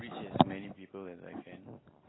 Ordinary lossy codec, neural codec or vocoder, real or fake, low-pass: AAC, 16 kbps; none; real; 7.2 kHz